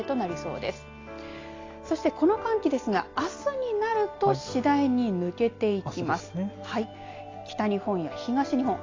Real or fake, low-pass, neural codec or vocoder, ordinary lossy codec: real; 7.2 kHz; none; AAC, 32 kbps